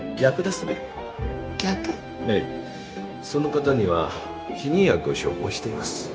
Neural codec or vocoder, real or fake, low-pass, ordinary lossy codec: codec, 16 kHz, 0.9 kbps, LongCat-Audio-Codec; fake; none; none